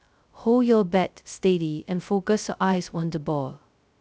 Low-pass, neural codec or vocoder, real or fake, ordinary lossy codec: none; codec, 16 kHz, 0.2 kbps, FocalCodec; fake; none